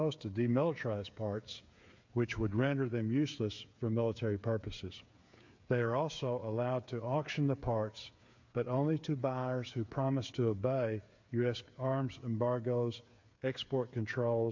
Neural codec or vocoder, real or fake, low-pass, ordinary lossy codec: codec, 16 kHz, 8 kbps, FreqCodec, smaller model; fake; 7.2 kHz; MP3, 48 kbps